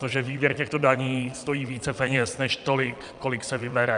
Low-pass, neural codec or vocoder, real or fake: 9.9 kHz; vocoder, 22.05 kHz, 80 mel bands, Vocos; fake